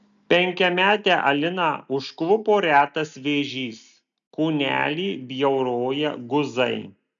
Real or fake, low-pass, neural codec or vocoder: real; 7.2 kHz; none